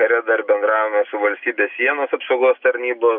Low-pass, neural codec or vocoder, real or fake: 5.4 kHz; none; real